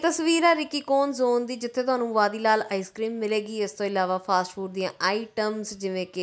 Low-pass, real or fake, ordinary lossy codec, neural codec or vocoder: none; real; none; none